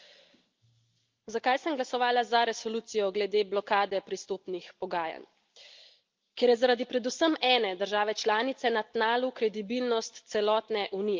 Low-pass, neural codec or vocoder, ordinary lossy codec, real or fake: 7.2 kHz; none; Opus, 24 kbps; real